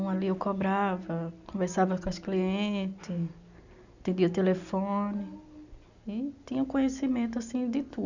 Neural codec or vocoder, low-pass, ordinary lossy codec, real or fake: none; 7.2 kHz; none; real